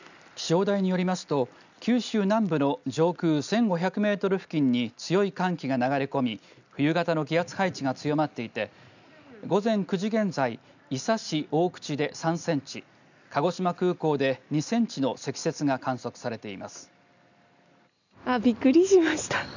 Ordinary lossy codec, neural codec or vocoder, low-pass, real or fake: none; none; 7.2 kHz; real